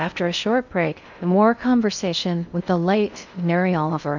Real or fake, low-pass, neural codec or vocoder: fake; 7.2 kHz; codec, 16 kHz in and 24 kHz out, 0.6 kbps, FocalCodec, streaming, 2048 codes